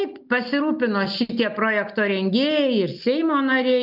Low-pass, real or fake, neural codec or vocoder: 5.4 kHz; real; none